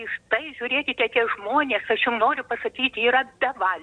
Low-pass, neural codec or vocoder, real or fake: 9.9 kHz; none; real